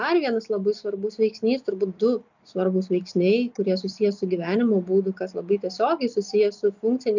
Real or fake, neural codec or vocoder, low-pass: real; none; 7.2 kHz